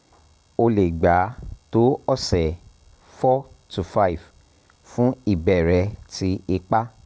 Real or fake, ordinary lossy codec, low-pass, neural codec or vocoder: real; none; none; none